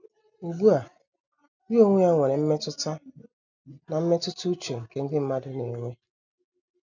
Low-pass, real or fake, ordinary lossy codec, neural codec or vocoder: 7.2 kHz; real; none; none